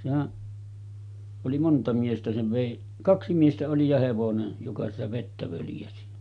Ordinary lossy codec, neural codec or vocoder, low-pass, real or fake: none; none; 9.9 kHz; real